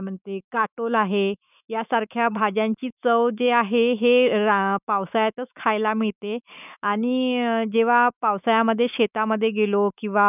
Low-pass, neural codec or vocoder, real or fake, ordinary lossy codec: 3.6 kHz; autoencoder, 48 kHz, 128 numbers a frame, DAC-VAE, trained on Japanese speech; fake; none